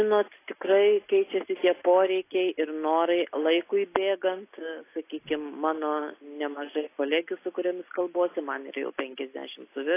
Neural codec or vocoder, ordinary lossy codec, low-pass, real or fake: none; AAC, 24 kbps; 3.6 kHz; real